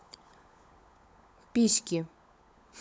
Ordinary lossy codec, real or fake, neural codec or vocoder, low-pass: none; fake; codec, 16 kHz, 16 kbps, FunCodec, trained on LibriTTS, 50 frames a second; none